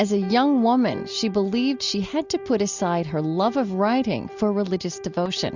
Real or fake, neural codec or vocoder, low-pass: real; none; 7.2 kHz